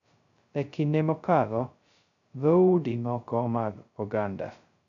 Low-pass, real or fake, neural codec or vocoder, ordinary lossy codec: 7.2 kHz; fake; codec, 16 kHz, 0.2 kbps, FocalCodec; none